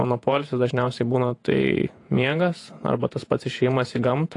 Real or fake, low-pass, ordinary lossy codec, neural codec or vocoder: fake; 10.8 kHz; AAC, 48 kbps; vocoder, 48 kHz, 128 mel bands, Vocos